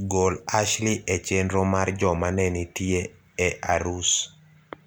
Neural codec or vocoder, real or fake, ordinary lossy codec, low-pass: none; real; none; none